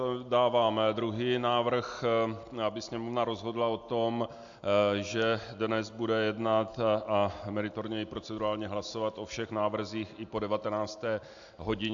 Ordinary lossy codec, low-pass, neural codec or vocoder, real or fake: AAC, 64 kbps; 7.2 kHz; none; real